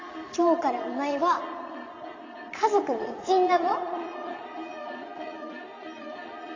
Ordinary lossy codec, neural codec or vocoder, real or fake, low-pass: AAC, 32 kbps; vocoder, 22.05 kHz, 80 mel bands, Vocos; fake; 7.2 kHz